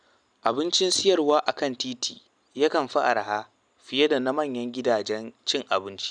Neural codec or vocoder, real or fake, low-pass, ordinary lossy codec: none; real; 9.9 kHz; none